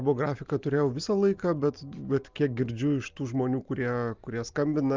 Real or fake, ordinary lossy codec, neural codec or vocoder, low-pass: real; Opus, 24 kbps; none; 7.2 kHz